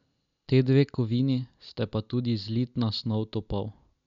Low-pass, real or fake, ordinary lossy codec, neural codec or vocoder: 7.2 kHz; real; none; none